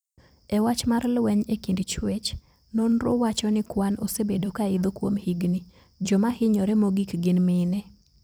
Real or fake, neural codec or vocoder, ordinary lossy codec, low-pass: fake; vocoder, 44.1 kHz, 128 mel bands every 256 samples, BigVGAN v2; none; none